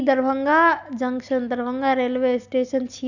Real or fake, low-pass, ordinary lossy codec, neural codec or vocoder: real; 7.2 kHz; none; none